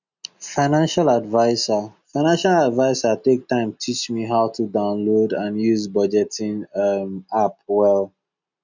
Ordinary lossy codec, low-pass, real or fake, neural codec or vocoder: none; 7.2 kHz; real; none